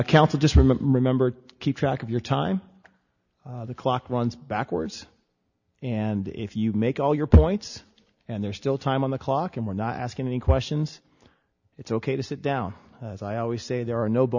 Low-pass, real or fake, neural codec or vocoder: 7.2 kHz; real; none